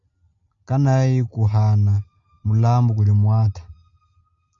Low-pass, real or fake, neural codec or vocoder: 7.2 kHz; real; none